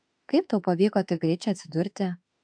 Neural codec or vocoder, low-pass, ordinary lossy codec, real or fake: autoencoder, 48 kHz, 32 numbers a frame, DAC-VAE, trained on Japanese speech; 9.9 kHz; AAC, 64 kbps; fake